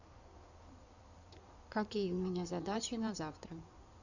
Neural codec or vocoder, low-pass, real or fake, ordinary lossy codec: codec, 16 kHz in and 24 kHz out, 2.2 kbps, FireRedTTS-2 codec; 7.2 kHz; fake; none